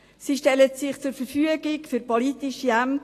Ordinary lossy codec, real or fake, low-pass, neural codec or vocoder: AAC, 48 kbps; real; 14.4 kHz; none